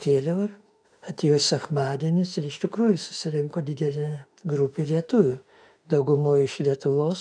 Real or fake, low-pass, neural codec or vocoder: fake; 9.9 kHz; autoencoder, 48 kHz, 32 numbers a frame, DAC-VAE, trained on Japanese speech